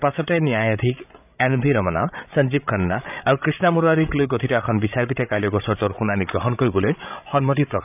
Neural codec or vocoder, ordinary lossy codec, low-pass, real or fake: codec, 16 kHz, 16 kbps, FreqCodec, larger model; none; 3.6 kHz; fake